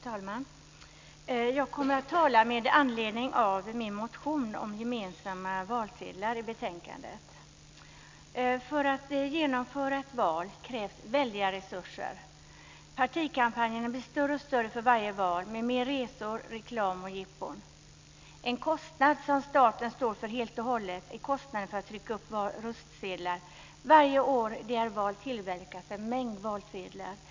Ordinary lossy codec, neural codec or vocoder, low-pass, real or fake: MP3, 64 kbps; none; 7.2 kHz; real